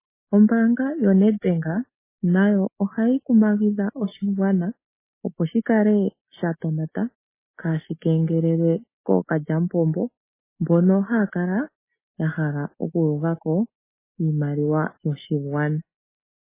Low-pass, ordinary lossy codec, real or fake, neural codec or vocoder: 3.6 kHz; MP3, 16 kbps; real; none